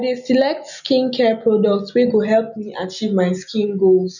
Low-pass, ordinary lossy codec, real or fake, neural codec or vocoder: 7.2 kHz; none; real; none